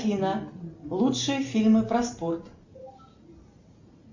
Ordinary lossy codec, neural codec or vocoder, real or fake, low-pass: Opus, 64 kbps; none; real; 7.2 kHz